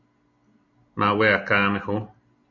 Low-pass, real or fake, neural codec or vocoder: 7.2 kHz; real; none